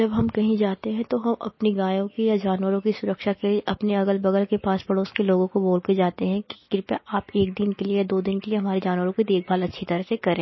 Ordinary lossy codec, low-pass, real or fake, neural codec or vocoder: MP3, 24 kbps; 7.2 kHz; real; none